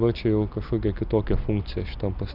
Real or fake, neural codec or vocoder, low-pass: real; none; 5.4 kHz